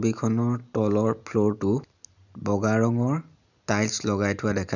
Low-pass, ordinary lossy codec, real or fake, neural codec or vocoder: 7.2 kHz; none; real; none